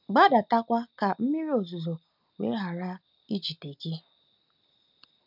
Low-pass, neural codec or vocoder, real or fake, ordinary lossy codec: 5.4 kHz; none; real; none